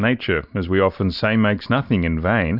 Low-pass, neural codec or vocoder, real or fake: 5.4 kHz; none; real